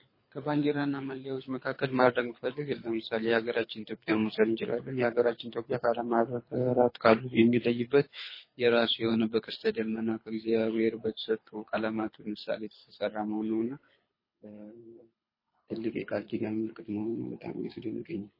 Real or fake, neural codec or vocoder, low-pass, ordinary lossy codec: fake; codec, 24 kHz, 3 kbps, HILCodec; 5.4 kHz; MP3, 24 kbps